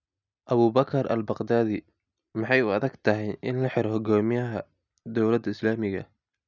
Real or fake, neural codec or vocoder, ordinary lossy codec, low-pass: real; none; none; 7.2 kHz